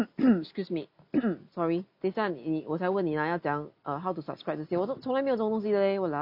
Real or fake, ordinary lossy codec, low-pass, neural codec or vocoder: real; none; 5.4 kHz; none